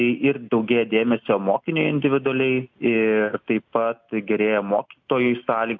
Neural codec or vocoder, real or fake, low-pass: none; real; 7.2 kHz